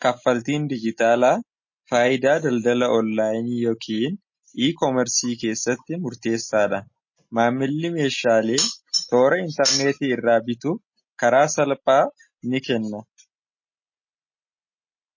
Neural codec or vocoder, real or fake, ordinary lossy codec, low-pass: none; real; MP3, 32 kbps; 7.2 kHz